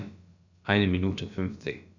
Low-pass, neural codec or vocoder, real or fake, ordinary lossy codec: 7.2 kHz; codec, 16 kHz, about 1 kbps, DyCAST, with the encoder's durations; fake; none